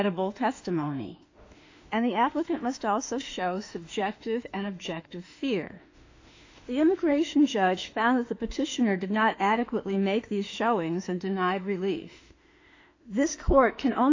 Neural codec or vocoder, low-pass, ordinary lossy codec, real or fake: autoencoder, 48 kHz, 32 numbers a frame, DAC-VAE, trained on Japanese speech; 7.2 kHz; AAC, 48 kbps; fake